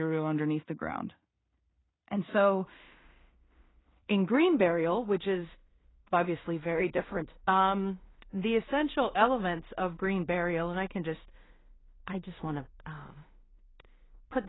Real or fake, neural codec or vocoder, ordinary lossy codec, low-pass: fake; codec, 16 kHz in and 24 kHz out, 0.4 kbps, LongCat-Audio-Codec, two codebook decoder; AAC, 16 kbps; 7.2 kHz